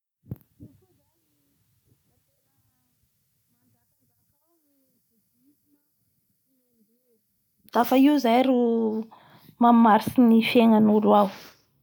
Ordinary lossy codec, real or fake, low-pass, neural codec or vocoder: none; real; 19.8 kHz; none